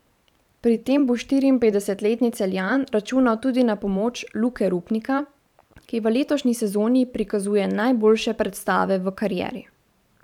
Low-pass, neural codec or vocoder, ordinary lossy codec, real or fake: 19.8 kHz; vocoder, 44.1 kHz, 128 mel bands every 512 samples, BigVGAN v2; none; fake